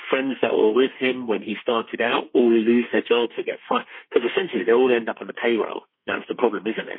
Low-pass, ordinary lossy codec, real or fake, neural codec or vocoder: 5.4 kHz; MP3, 24 kbps; fake; codec, 32 kHz, 1.9 kbps, SNAC